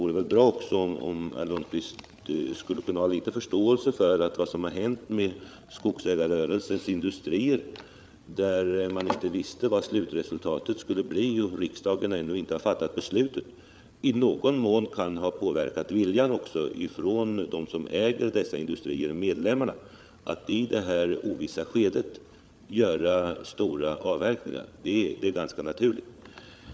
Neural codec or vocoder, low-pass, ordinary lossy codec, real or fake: codec, 16 kHz, 8 kbps, FreqCodec, larger model; none; none; fake